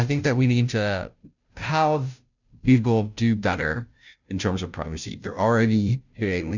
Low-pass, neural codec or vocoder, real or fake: 7.2 kHz; codec, 16 kHz, 0.5 kbps, FunCodec, trained on Chinese and English, 25 frames a second; fake